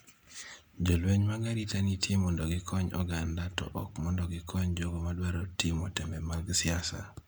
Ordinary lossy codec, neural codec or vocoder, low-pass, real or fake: none; none; none; real